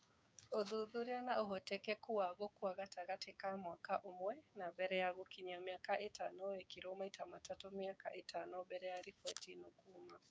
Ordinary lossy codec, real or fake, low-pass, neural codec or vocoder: none; fake; none; codec, 16 kHz, 6 kbps, DAC